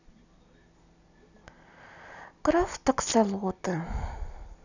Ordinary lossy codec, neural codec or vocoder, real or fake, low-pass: none; none; real; 7.2 kHz